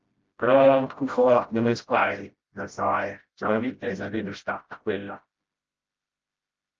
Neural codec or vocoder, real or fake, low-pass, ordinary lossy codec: codec, 16 kHz, 0.5 kbps, FreqCodec, smaller model; fake; 7.2 kHz; Opus, 24 kbps